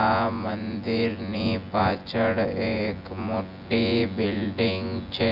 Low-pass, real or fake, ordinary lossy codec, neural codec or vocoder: 5.4 kHz; fake; none; vocoder, 24 kHz, 100 mel bands, Vocos